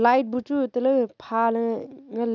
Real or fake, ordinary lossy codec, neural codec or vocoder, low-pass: real; none; none; 7.2 kHz